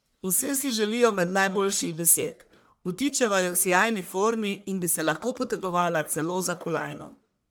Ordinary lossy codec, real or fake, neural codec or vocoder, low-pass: none; fake; codec, 44.1 kHz, 1.7 kbps, Pupu-Codec; none